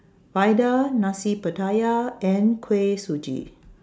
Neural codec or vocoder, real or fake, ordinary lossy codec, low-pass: none; real; none; none